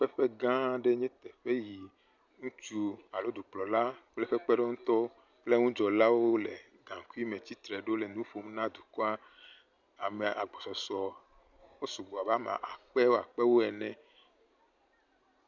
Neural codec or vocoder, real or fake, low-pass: none; real; 7.2 kHz